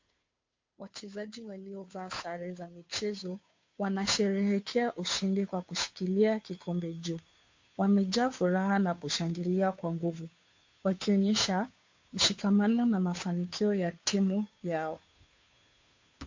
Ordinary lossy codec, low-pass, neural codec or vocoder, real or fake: MP3, 48 kbps; 7.2 kHz; codec, 16 kHz, 2 kbps, FunCodec, trained on Chinese and English, 25 frames a second; fake